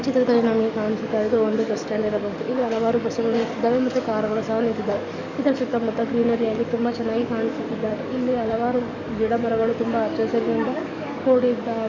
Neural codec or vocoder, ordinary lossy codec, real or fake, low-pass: codec, 44.1 kHz, 7.8 kbps, DAC; none; fake; 7.2 kHz